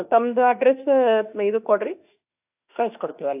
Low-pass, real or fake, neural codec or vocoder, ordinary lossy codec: 3.6 kHz; fake; autoencoder, 48 kHz, 32 numbers a frame, DAC-VAE, trained on Japanese speech; AAC, 32 kbps